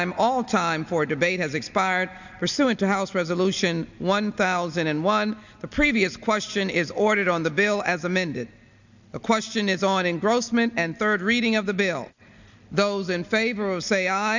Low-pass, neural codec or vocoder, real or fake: 7.2 kHz; none; real